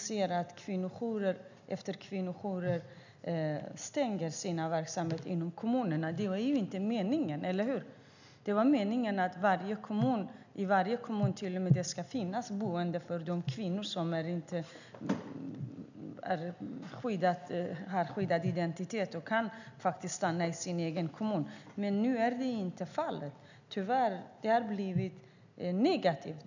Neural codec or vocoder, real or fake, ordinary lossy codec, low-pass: none; real; none; 7.2 kHz